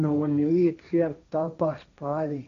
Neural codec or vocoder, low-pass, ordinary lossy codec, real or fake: codec, 16 kHz, 1.1 kbps, Voila-Tokenizer; 7.2 kHz; none; fake